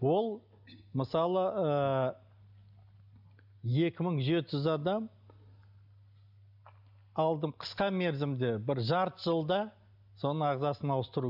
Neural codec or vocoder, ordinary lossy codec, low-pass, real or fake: none; none; 5.4 kHz; real